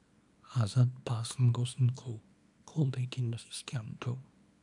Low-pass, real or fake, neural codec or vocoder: 10.8 kHz; fake; codec, 24 kHz, 0.9 kbps, WavTokenizer, small release